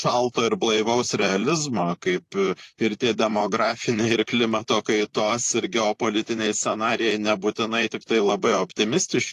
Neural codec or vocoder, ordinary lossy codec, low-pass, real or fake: vocoder, 44.1 kHz, 128 mel bands, Pupu-Vocoder; AAC, 48 kbps; 14.4 kHz; fake